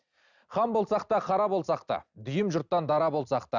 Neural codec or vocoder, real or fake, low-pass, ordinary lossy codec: none; real; 7.2 kHz; none